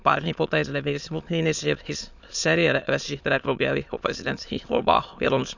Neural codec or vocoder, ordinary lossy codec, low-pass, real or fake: autoencoder, 22.05 kHz, a latent of 192 numbers a frame, VITS, trained on many speakers; none; 7.2 kHz; fake